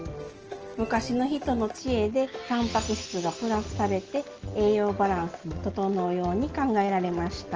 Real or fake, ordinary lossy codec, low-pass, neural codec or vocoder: real; Opus, 16 kbps; 7.2 kHz; none